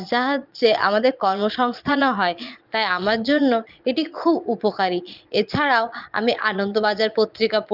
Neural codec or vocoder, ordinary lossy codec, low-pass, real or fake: vocoder, 22.05 kHz, 80 mel bands, Vocos; Opus, 24 kbps; 5.4 kHz; fake